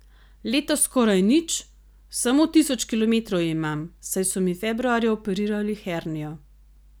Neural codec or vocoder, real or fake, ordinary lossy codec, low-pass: none; real; none; none